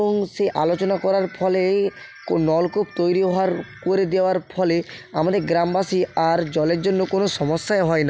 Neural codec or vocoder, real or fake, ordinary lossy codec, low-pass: none; real; none; none